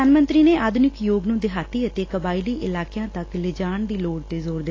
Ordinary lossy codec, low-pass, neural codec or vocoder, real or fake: AAC, 32 kbps; 7.2 kHz; none; real